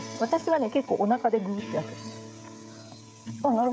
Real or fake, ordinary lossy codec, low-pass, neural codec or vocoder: fake; none; none; codec, 16 kHz, 16 kbps, FreqCodec, smaller model